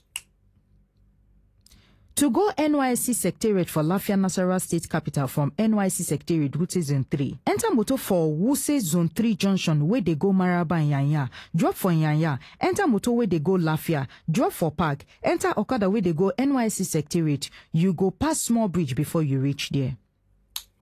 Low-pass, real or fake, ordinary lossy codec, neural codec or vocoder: 14.4 kHz; real; AAC, 48 kbps; none